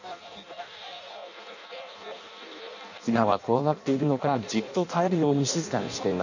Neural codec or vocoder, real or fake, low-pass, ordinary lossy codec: codec, 16 kHz in and 24 kHz out, 0.6 kbps, FireRedTTS-2 codec; fake; 7.2 kHz; none